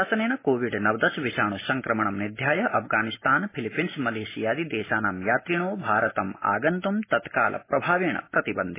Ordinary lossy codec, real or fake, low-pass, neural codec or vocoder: MP3, 16 kbps; real; 3.6 kHz; none